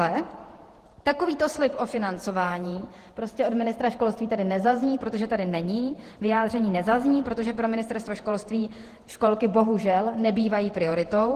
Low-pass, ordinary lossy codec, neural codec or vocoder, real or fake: 14.4 kHz; Opus, 16 kbps; vocoder, 48 kHz, 128 mel bands, Vocos; fake